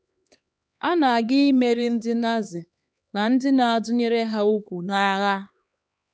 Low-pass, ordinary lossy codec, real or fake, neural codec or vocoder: none; none; fake; codec, 16 kHz, 2 kbps, X-Codec, HuBERT features, trained on LibriSpeech